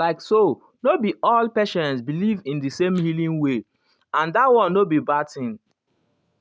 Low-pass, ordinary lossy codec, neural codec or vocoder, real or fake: none; none; none; real